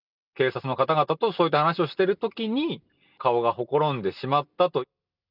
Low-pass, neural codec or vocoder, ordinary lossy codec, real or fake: 5.4 kHz; none; none; real